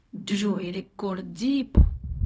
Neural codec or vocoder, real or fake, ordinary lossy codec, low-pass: codec, 16 kHz, 0.4 kbps, LongCat-Audio-Codec; fake; none; none